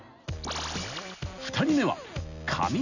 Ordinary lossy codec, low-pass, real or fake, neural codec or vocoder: none; 7.2 kHz; real; none